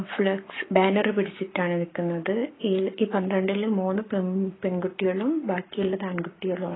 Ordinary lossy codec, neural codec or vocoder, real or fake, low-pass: AAC, 16 kbps; codec, 44.1 kHz, 7.8 kbps, Pupu-Codec; fake; 7.2 kHz